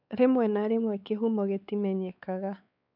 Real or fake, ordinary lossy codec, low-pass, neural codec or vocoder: fake; none; 5.4 kHz; codec, 16 kHz, 4 kbps, X-Codec, WavLM features, trained on Multilingual LibriSpeech